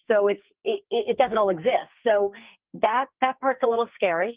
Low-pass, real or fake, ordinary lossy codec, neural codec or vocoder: 3.6 kHz; fake; Opus, 32 kbps; codec, 44.1 kHz, 2.6 kbps, SNAC